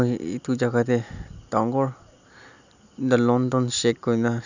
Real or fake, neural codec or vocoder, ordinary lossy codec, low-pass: real; none; none; 7.2 kHz